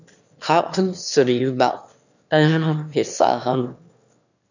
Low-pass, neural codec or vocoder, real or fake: 7.2 kHz; autoencoder, 22.05 kHz, a latent of 192 numbers a frame, VITS, trained on one speaker; fake